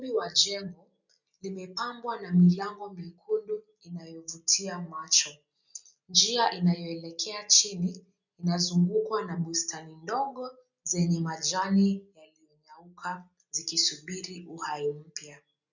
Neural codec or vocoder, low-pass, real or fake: none; 7.2 kHz; real